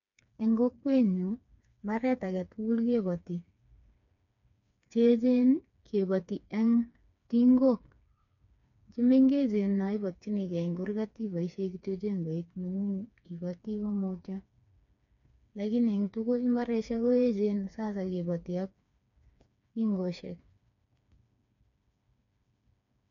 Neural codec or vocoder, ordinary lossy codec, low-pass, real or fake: codec, 16 kHz, 4 kbps, FreqCodec, smaller model; none; 7.2 kHz; fake